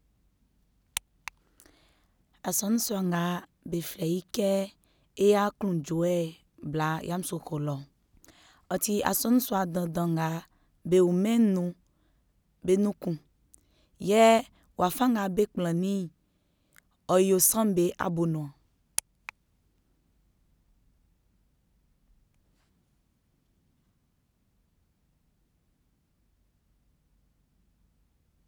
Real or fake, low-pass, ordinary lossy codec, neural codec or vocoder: real; none; none; none